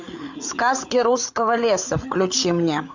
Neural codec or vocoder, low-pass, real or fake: codec, 16 kHz, 8 kbps, FreqCodec, larger model; 7.2 kHz; fake